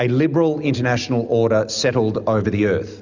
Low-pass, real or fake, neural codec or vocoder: 7.2 kHz; real; none